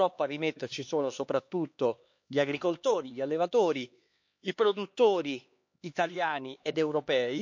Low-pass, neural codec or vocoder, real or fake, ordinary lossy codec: 7.2 kHz; codec, 16 kHz, 2 kbps, X-Codec, HuBERT features, trained on LibriSpeech; fake; MP3, 48 kbps